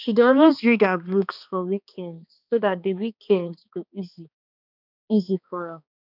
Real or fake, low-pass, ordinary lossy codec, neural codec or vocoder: fake; 5.4 kHz; none; codec, 16 kHz, 2 kbps, X-Codec, HuBERT features, trained on balanced general audio